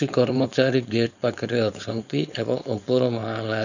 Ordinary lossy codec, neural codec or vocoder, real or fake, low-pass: none; codec, 16 kHz, 4.8 kbps, FACodec; fake; 7.2 kHz